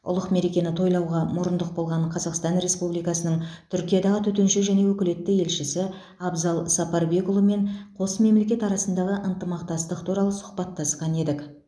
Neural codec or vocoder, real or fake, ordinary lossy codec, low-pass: none; real; none; none